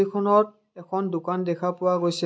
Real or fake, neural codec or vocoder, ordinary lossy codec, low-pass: real; none; none; none